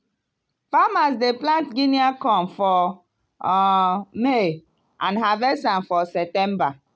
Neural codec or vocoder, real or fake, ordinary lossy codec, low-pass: none; real; none; none